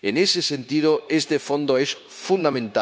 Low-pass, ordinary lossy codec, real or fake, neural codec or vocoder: none; none; fake; codec, 16 kHz, 0.9 kbps, LongCat-Audio-Codec